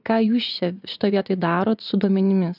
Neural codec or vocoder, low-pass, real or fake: vocoder, 44.1 kHz, 80 mel bands, Vocos; 5.4 kHz; fake